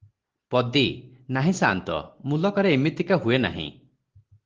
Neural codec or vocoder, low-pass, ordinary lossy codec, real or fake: none; 7.2 kHz; Opus, 16 kbps; real